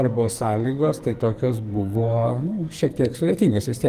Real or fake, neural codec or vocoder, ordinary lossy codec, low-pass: fake; codec, 44.1 kHz, 2.6 kbps, SNAC; Opus, 24 kbps; 14.4 kHz